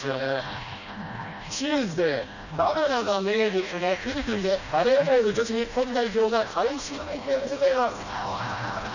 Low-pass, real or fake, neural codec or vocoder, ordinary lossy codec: 7.2 kHz; fake; codec, 16 kHz, 1 kbps, FreqCodec, smaller model; none